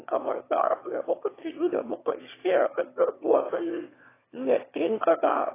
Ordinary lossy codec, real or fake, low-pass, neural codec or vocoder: AAC, 16 kbps; fake; 3.6 kHz; autoencoder, 22.05 kHz, a latent of 192 numbers a frame, VITS, trained on one speaker